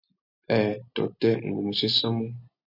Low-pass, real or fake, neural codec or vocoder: 5.4 kHz; real; none